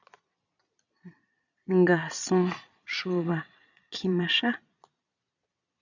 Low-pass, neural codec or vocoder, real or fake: 7.2 kHz; vocoder, 22.05 kHz, 80 mel bands, Vocos; fake